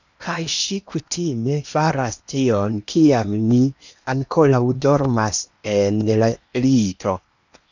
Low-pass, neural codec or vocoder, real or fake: 7.2 kHz; codec, 16 kHz in and 24 kHz out, 0.8 kbps, FocalCodec, streaming, 65536 codes; fake